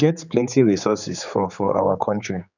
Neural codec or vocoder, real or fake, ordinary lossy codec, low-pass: codec, 16 kHz, 4 kbps, X-Codec, HuBERT features, trained on general audio; fake; none; 7.2 kHz